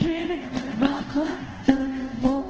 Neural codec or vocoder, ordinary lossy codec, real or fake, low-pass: codec, 24 kHz, 0.5 kbps, DualCodec; Opus, 16 kbps; fake; 7.2 kHz